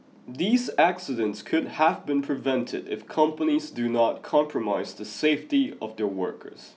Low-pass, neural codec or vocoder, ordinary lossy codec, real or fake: none; none; none; real